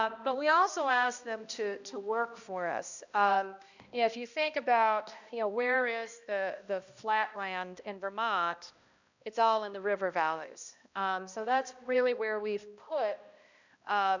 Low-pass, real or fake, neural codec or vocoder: 7.2 kHz; fake; codec, 16 kHz, 1 kbps, X-Codec, HuBERT features, trained on balanced general audio